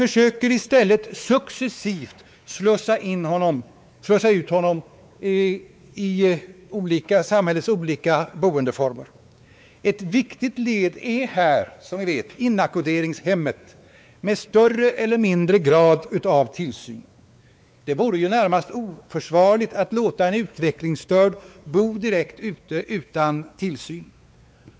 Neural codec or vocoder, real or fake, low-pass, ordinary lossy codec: codec, 16 kHz, 4 kbps, X-Codec, WavLM features, trained on Multilingual LibriSpeech; fake; none; none